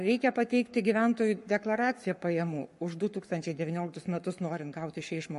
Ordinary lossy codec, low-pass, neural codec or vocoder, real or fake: MP3, 48 kbps; 14.4 kHz; codec, 44.1 kHz, 7.8 kbps, Pupu-Codec; fake